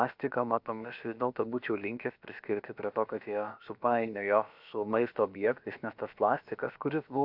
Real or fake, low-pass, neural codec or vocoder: fake; 5.4 kHz; codec, 16 kHz, about 1 kbps, DyCAST, with the encoder's durations